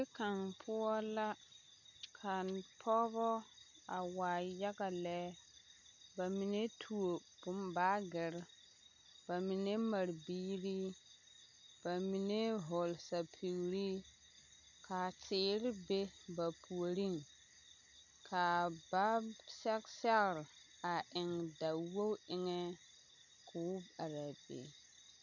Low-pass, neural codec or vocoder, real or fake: 7.2 kHz; none; real